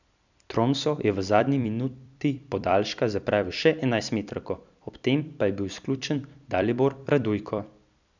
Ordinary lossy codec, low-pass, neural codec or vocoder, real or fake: none; 7.2 kHz; vocoder, 44.1 kHz, 128 mel bands every 256 samples, BigVGAN v2; fake